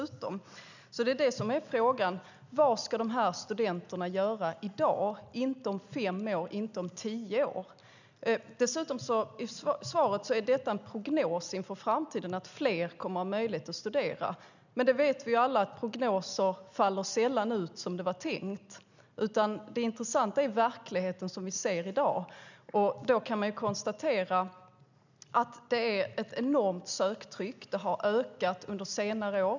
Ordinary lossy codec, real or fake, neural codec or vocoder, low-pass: none; real; none; 7.2 kHz